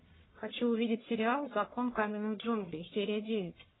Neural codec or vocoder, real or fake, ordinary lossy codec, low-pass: codec, 44.1 kHz, 1.7 kbps, Pupu-Codec; fake; AAC, 16 kbps; 7.2 kHz